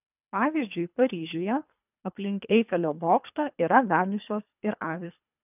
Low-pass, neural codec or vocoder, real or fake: 3.6 kHz; codec, 24 kHz, 3 kbps, HILCodec; fake